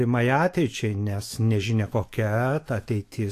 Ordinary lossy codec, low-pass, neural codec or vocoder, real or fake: AAC, 64 kbps; 14.4 kHz; autoencoder, 48 kHz, 128 numbers a frame, DAC-VAE, trained on Japanese speech; fake